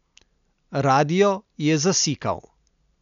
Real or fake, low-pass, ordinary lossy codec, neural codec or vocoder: real; 7.2 kHz; none; none